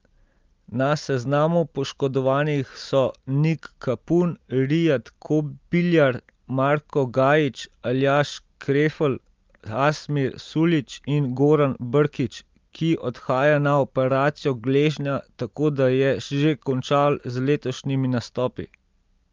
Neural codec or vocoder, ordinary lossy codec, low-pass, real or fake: none; Opus, 32 kbps; 7.2 kHz; real